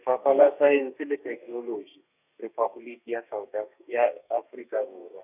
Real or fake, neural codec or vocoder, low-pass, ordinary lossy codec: fake; codec, 32 kHz, 1.9 kbps, SNAC; 3.6 kHz; none